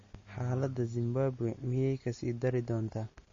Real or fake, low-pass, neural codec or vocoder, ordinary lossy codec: real; 7.2 kHz; none; MP3, 32 kbps